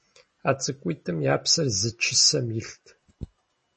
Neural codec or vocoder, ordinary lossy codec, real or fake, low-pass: none; MP3, 32 kbps; real; 10.8 kHz